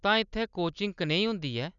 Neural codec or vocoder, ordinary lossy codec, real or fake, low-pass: none; none; real; 7.2 kHz